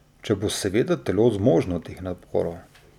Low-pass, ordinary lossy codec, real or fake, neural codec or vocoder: 19.8 kHz; none; real; none